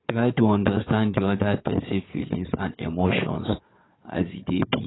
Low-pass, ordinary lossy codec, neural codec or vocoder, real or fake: 7.2 kHz; AAC, 16 kbps; codec, 16 kHz, 4 kbps, FunCodec, trained on Chinese and English, 50 frames a second; fake